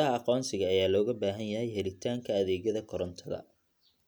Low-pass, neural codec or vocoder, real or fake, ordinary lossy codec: none; none; real; none